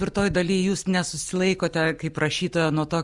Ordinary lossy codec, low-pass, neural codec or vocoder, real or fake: Opus, 64 kbps; 10.8 kHz; none; real